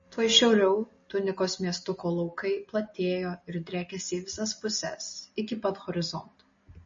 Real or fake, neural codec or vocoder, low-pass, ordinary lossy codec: real; none; 7.2 kHz; MP3, 32 kbps